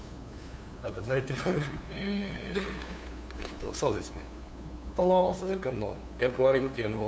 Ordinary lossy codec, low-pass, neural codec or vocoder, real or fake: none; none; codec, 16 kHz, 2 kbps, FunCodec, trained on LibriTTS, 25 frames a second; fake